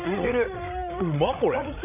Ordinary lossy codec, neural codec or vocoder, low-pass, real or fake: none; codec, 16 kHz, 16 kbps, FreqCodec, larger model; 3.6 kHz; fake